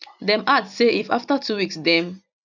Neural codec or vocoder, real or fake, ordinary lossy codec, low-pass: none; real; none; 7.2 kHz